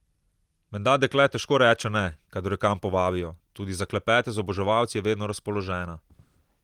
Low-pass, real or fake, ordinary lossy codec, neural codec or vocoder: 19.8 kHz; real; Opus, 24 kbps; none